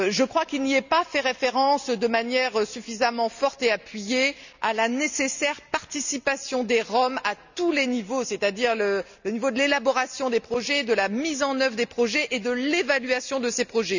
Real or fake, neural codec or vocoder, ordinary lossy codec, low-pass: real; none; none; 7.2 kHz